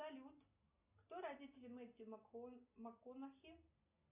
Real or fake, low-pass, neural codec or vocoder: real; 3.6 kHz; none